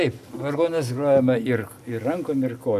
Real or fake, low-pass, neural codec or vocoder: real; 14.4 kHz; none